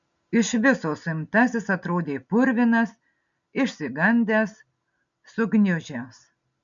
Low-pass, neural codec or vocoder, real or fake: 7.2 kHz; none; real